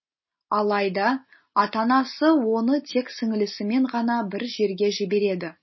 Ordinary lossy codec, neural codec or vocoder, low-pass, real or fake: MP3, 24 kbps; none; 7.2 kHz; real